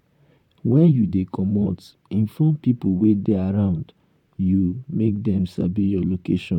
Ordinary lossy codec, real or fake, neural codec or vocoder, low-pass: none; fake; vocoder, 44.1 kHz, 128 mel bands, Pupu-Vocoder; 19.8 kHz